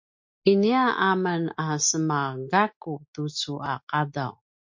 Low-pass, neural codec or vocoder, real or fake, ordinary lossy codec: 7.2 kHz; none; real; MP3, 48 kbps